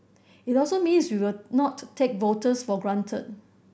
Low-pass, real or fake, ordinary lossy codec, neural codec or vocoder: none; real; none; none